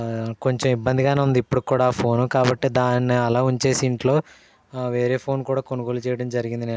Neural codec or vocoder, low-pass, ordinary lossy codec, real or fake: none; none; none; real